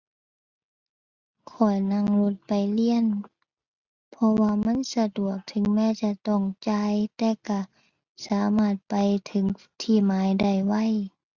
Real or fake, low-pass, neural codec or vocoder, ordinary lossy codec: real; 7.2 kHz; none; Opus, 64 kbps